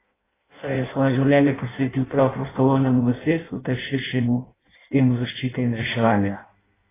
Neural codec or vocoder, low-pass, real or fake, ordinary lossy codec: codec, 16 kHz in and 24 kHz out, 0.6 kbps, FireRedTTS-2 codec; 3.6 kHz; fake; AAC, 16 kbps